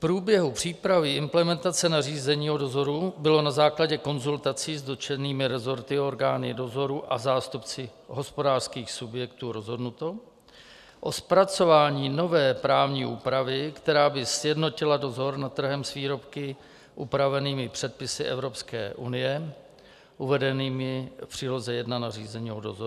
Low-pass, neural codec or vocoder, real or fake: 14.4 kHz; none; real